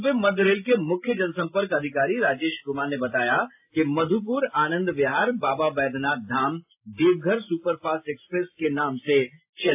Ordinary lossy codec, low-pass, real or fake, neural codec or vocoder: AAC, 32 kbps; 3.6 kHz; real; none